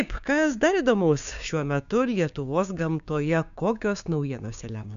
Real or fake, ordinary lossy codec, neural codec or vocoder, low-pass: fake; MP3, 96 kbps; codec, 16 kHz, 6 kbps, DAC; 7.2 kHz